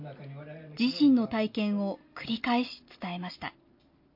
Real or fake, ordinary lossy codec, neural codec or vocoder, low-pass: real; MP3, 32 kbps; none; 5.4 kHz